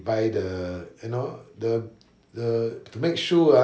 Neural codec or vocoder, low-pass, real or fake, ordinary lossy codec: none; none; real; none